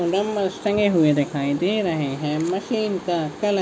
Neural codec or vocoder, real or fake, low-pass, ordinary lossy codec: none; real; none; none